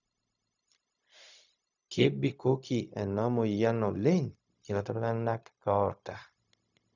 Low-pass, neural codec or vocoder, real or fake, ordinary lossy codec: 7.2 kHz; codec, 16 kHz, 0.4 kbps, LongCat-Audio-Codec; fake; none